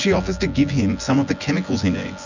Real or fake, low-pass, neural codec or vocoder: fake; 7.2 kHz; vocoder, 24 kHz, 100 mel bands, Vocos